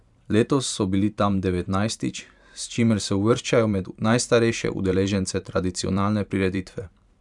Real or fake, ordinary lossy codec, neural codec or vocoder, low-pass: fake; none; vocoder, 44.1 kHz, 128 mel bands every 512 samples, BigVGAN v2; 10.8 kHz